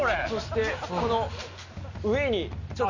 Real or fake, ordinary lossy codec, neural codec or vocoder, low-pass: real; Opus, 64 kbps; none; 7.2 kHz